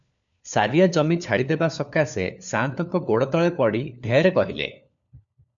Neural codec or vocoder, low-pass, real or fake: codec, 16 kHz, 4 kbps, FunCodec, trained on LibriTTS, 50 frames a second; 7.2 kHz; fake